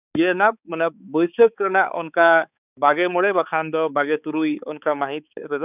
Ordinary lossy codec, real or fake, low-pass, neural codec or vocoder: none; fake; 3.6 kHz; codec, 16 kHz, 4 kbps, X-Codec, HuBERT features, trained on balanced general audio